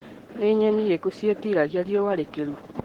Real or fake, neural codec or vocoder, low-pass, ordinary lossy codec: fake; codec, 44.1 kHz, 7.8 kbps, Pupu-Codec; 19.8 kHz; Opus, 16 kbps